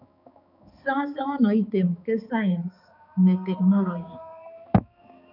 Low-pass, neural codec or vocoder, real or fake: 5.4 kHz; codec, 16 kHz, 4 kbps, X-Codec, HuBERT features, trained on balanced general audio; fake